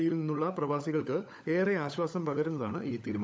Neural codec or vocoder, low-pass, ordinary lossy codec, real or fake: codec, 16 kHz, 16 kbps, FunCodec, trained on LibriTTS, 50 frames a second; none; none; fake